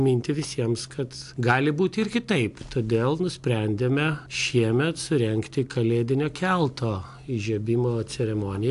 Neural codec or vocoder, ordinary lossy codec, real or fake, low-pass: none; AAC, 64 kbps; real; 10.8 kHz